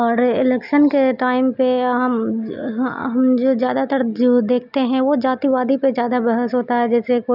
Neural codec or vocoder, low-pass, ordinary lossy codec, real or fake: none; 5.4 kHz; none; real